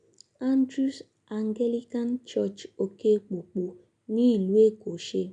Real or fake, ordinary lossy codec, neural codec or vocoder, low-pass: real; none; none; 9.9 kHz